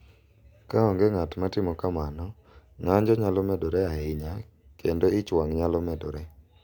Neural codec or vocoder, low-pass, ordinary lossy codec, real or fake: none; 19.8 kHz; none; real